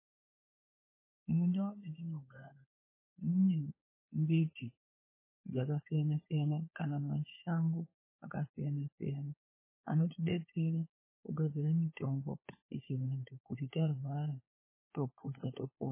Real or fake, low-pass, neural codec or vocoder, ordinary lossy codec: fake; 3.6 kHz; codec, 16 kHz, 4 kbps, FunCodec, trained on LibriTTS, 50 frames a second; MP3, 16 kbps